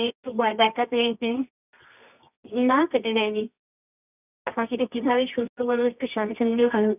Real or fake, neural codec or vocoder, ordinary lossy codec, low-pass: fake; codec, 24 kHz, 0.9 kbps, WavTokenizer, medium music audio release; none; 3.6 kHz